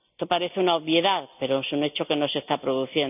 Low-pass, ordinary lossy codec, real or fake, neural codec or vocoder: 3.6 kHz; none; real; none